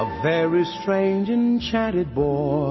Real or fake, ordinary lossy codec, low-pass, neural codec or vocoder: real; MP3, 24 kbps; 7.2 kHz; none